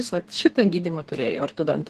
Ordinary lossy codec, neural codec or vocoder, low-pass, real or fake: Opus, 16 kbps; codec, 32 kHz, 1.9 kbps, SNAC; 14.4 kHz; fake